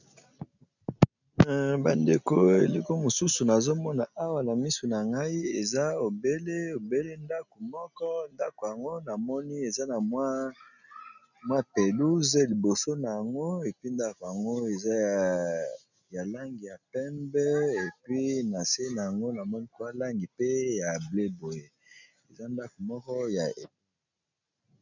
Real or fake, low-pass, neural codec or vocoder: real; 7.2 kHz; none